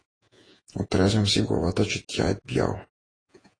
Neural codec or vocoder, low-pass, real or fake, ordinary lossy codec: vocoder, 48 kHz, 128 mel bands, Vocos; 9.9 kHz; fake; AAC, 32 kbps